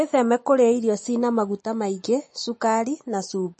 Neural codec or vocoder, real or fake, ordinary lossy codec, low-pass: none; real; MP3, 32 kbps; 10.8 kHz